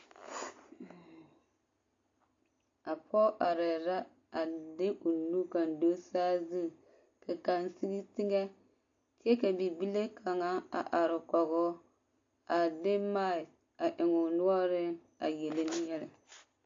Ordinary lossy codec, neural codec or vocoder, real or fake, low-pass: AAC, 48 kbps; none; real; 7.2 kHz